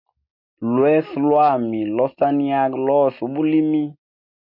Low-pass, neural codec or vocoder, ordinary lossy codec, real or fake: 5.4 kHz; none; MP3, 32 kbps; real